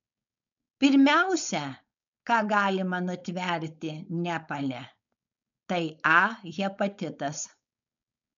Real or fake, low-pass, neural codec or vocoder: fake; 7.2 kHz; codec, 16 kHz, 4.8 kbps, FACodec